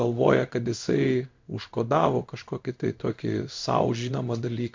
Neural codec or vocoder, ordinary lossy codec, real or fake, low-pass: codec, 16 kHz in and 24 kHz out, 1 kbps, XY-Tokenizer; MP3, 64 kbps; fake; 7.2 kHz